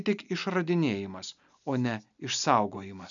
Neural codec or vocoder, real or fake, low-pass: none; real; 7.2 kHz